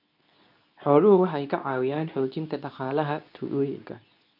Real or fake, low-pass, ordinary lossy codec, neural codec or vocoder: fake; 5.4 kHz; none; codec, 24 kHz, 0.9 kbps, WavTokenizer, medium speech release version 2